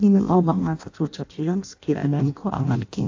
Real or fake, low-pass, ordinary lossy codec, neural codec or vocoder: fake; 7.2 kHz; AAC, 48 kbps; codec, 16 kHz in and 24 kHz out, 0.6 kbps, FireRedTTS-2 codec